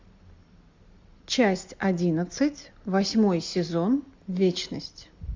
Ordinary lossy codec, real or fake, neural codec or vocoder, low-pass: MP3, 64 kbps; real; none; 7.2 kHz